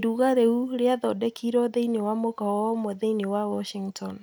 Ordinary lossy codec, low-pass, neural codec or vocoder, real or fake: none; none; none; real